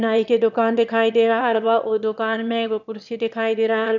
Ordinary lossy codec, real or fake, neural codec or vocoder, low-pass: none; fake; autoencoder, 22.05 kHz, a latent of 192 numbers a frame, VITS, trained on one speaker; 7.2 kHz